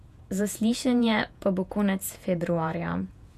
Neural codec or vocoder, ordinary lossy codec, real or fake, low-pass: vocoder, 48 kHz, 128 mel bands, Vocos; none; fake; 14.4 kHz